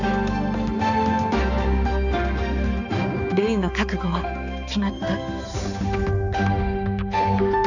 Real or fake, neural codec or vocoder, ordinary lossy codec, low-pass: fake; codec, 16 kHz, 4 kbps, X-Codec, HuBERT features, trained on balanced general audio; none; 7.2 kHz